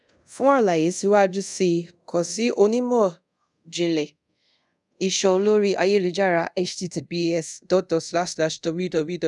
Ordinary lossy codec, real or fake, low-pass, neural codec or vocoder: none; fake; 10.8 kHz; codec, 24 kHz, 0.5 kbps, DualCodec